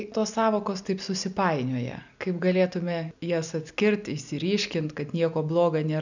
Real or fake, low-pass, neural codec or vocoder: real; 7.2 kHz; none